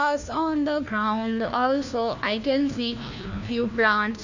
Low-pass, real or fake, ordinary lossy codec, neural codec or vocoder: 7.2 kHz; fake; none; codec, 16 kHz, 1 kbps, FunCodec, trained on LibriTTS, 50 frames a second